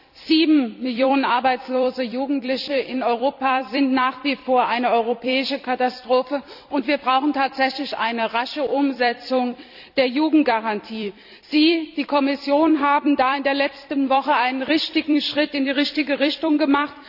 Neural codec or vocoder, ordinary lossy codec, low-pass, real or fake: vocoder, 44.1 kHz, 128 mel bands every 256 samples, BigVGAN v2; none; 5.4 kHz; fake